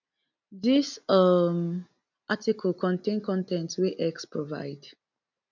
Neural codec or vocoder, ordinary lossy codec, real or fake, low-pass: none; none; real; 7.2 kHz